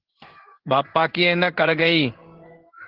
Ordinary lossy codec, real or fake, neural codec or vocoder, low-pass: Opus, 16 kbps; fake; codec, 16 kHz in and 24 kHz out, 1 kbps, XY-Tokenizer; 5.4 kHz